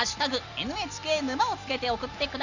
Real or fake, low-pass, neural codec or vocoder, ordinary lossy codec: real; 7.2 kHz; none; none